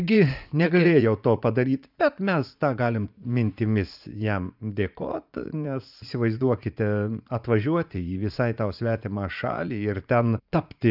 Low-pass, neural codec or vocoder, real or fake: 5.4 kHz; vocoder, 44.1 kHz, 80 mel bands, Vocos; fake